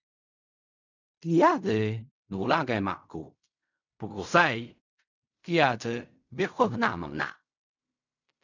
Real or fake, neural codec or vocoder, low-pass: fake; codec, 16 kHz in and 24 kHz out, 0.4 kbps, LongCat-Audio-Codec, fine tuned four codebook decoder; 7.2 kHz